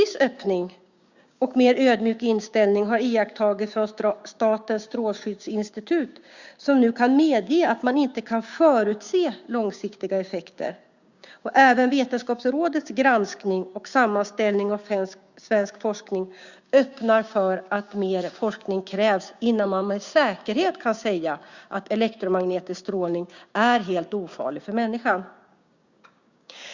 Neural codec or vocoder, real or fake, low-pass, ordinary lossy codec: codec, 16 kHz, 6 kbps, DAC; fake; 7.2 kHz; Opus, 64 kbps